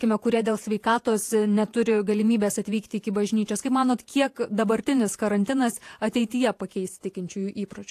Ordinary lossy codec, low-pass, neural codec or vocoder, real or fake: AAC, 64 kbps; 14.4 kHz; vocoder, 48 kHz, 128 mel bands, Vocos; fake